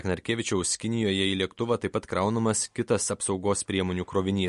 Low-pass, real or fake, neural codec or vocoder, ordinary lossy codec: 14.4 kHz; real; none; MP3, 48 kbps